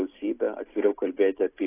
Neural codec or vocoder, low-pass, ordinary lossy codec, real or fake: none; 3.6 kHz; AAC, 16 kbps; real